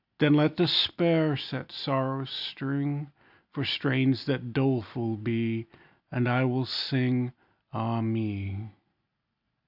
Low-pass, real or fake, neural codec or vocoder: 5.4 kHz; real; none